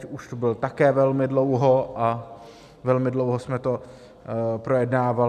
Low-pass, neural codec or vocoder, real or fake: 14.4 kHz; none; real